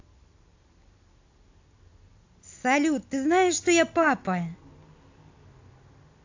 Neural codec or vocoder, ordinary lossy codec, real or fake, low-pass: none; AAC, 48 kbps; real; 7.2 kHz